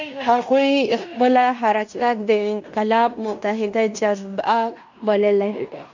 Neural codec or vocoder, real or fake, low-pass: codec, 16 kHz in and 24 kHz out, 0.9 kbps, LongCat-Audio-Codec, fine tuned four codebook decoder; fake; 7.2 kHz